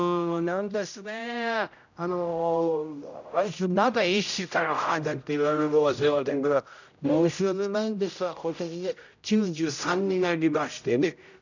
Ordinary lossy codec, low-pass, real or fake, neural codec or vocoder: none; 7.2 kHz; fake; codec, 16 kHz, 0.5 kbps, X-Codec, HuBERT features, trained on general audio